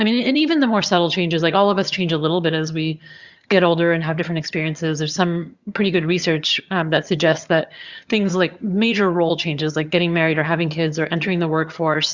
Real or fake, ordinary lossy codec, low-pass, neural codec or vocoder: fake; Opus, 64 kbps; 7.2 kHz; vocoder, 22.05 kHz, 80 mel bands, HiFi-GAN